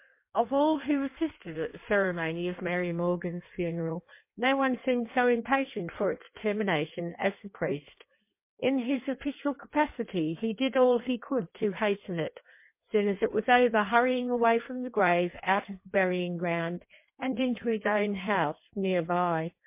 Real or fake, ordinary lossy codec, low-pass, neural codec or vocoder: fake; MP3, 24 kbps; 3.6 kHz; codec, 16 kHz in and 24 kHz out, 1.1 kbps, FireRedTTS-2 codec